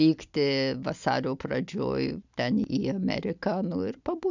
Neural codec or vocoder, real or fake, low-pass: none; real; 7.2 kHz